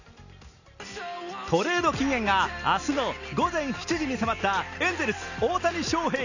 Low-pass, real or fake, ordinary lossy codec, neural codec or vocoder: 7.2 kHz; real; none; none